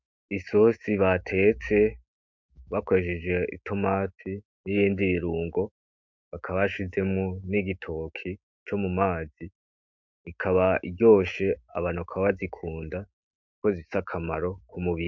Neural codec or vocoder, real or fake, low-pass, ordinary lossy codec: autoencoder, 48 kHz, 128 numbers a frame, DAC-VAE, trained on Japanese speech; fake; 7.2 kHz; MP3, 64 kbps